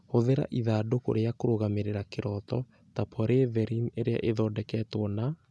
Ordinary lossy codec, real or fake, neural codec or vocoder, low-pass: none; real; none; none